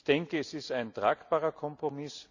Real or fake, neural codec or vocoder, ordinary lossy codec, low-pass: real; none; none; 7.2 kHz